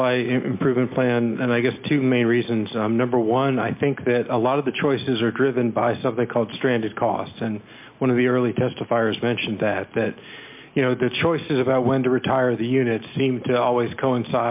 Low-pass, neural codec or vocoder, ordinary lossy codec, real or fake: 3.6 kHz; none; MP3, 32 kbps; real